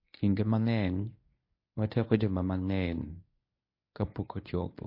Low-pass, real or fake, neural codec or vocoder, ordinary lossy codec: 5.4 kHz; fake; codec, 24 kHz, 0.9 kbps, WavTokenizer, medium speech release version 1; MP3, 32 kbps